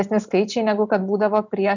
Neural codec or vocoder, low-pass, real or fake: none; 7.2 kHz; real